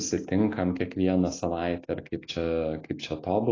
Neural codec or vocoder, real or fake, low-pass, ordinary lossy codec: none; real; 7.2 kHz; AAC, 32 kbps